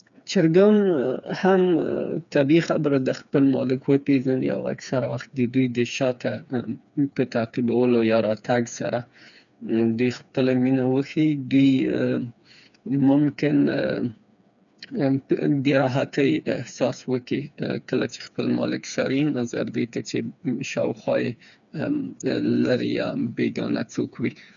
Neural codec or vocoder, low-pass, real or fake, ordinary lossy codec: codec, 16 kHz, 4 kbps, FreqCodec, smaller model; 7.2 kHz; fake; none